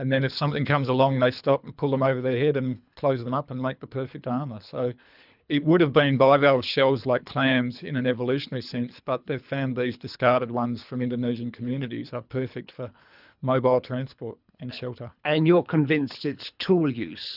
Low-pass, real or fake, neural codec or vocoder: 5.4 kHz; fake; codec, 24 kHz, 3 kbps, HILCodec